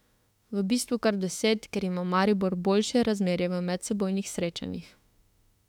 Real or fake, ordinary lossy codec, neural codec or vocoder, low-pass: fake; none; autoencoder, 48 kHz, 32 numbers a frame, DAC-VAE, trained on Japanese speech; 19.8 kHz